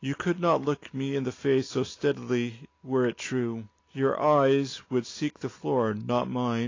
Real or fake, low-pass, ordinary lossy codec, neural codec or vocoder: real; 7.2 kHz; AAC, 32 kbps; none